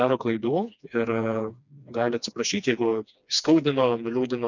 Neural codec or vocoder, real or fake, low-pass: codec, 16 kHz, 2 kbps, FreqCodec, smaller model; fake; 7.2 kHz